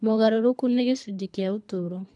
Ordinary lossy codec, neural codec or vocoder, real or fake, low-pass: none; codec, 24 kHz, 3 kbps, HILCodec; fake; 10.8 kHz